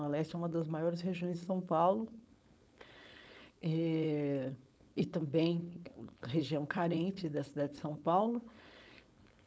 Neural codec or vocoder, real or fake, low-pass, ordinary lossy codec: codec, 16 kHz, 4.8 kbps, FACodec; fake; none; none